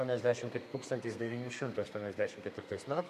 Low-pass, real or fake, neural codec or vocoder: 14.4 kHz; fake; codec, 32 kHz, 1.9 kbps, SNAC